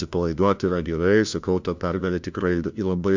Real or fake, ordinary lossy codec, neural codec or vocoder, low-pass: fake; MP3, 64 kbps; codec, 16 kHz, 1 kbps, FunCodec, trained on LibriTTS, 50 frames a second; 7.2 kHz